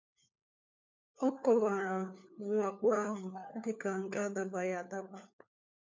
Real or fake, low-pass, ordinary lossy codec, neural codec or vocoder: fake; 7.2 kHz; MP3, 64 kbps; codec, 16 kHz, 4 kbps, FunCodec, trained on LibriTTS, 50 frames a second